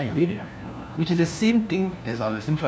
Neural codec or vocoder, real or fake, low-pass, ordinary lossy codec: codec, 16 kHz, 1 kbps, FunCodec, trained on LibriTTS, 50 frames a second; fake; none; none